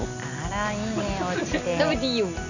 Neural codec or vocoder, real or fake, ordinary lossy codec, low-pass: none; real; none; 7.2 kHz